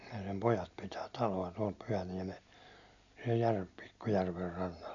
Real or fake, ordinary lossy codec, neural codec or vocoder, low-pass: real; none; none; 7.2 kHz